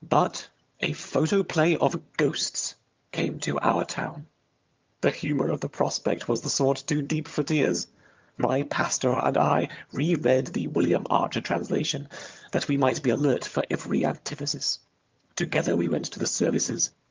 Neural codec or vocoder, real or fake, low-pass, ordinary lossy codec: vocoder, 22.05 kHz, 80 mel bands, HiFi-GAN; fake; 7.2 kHz; Opus, 24 kbps